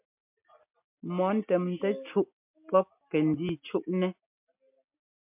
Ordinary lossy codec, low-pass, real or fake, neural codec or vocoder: MP3, 32 kbps; 3.6 kHz; real; none